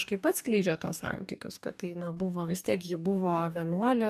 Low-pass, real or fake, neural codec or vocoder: 14.4 kHz; fake; codec, 44.1 kHz, 2.6 kbps, DAC